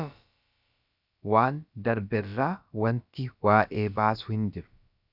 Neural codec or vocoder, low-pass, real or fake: codec, 16 kHz, about 1 kbps, DyCAST, with the encoder's durations; 5.4 kHz; fake